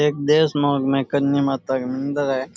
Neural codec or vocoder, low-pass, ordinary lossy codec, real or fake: none; none; none; real